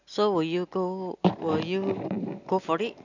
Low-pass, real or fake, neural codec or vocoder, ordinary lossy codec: 7.2 kHz; real; none; none